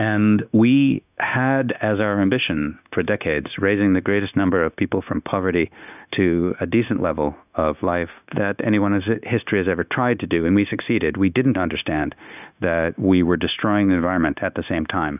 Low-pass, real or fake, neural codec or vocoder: 3.6 kHz; fake; codec, 16 kHz, 0.9 kbps, LongCat-Audio-Codec